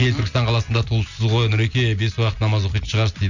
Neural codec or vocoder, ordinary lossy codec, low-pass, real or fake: none; none; 7.2 kHz; real